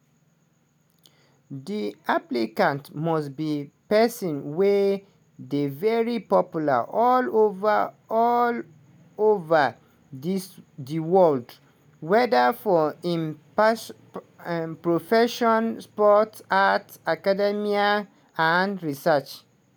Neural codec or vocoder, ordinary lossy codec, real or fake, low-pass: none; none; real; none